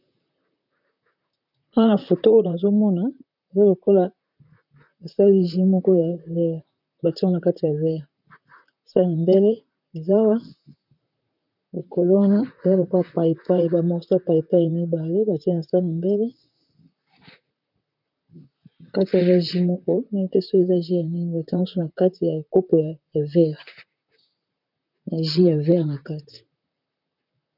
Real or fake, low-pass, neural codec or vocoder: fake; 5.4 kHz; vocoder, 44.1 kHz, 128 mel bands, Pupu-Vocoder